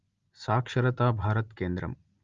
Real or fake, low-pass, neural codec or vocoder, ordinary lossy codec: real; 7.2 kHz; none; Opus, 32 kbps